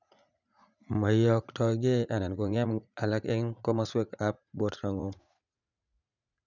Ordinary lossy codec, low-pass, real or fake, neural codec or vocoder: none; 7.2 kHz; fake; vocoder, 44.1 kHz, 80 mel bands, Vocos